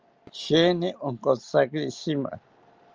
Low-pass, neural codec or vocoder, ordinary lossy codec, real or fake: 7.2 kHz; none; Opus, 24 kbps; real